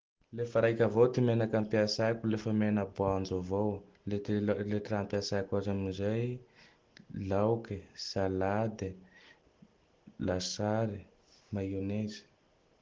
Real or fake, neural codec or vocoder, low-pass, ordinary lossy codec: real; none; 7.2 kHz; Opus, 16 kbps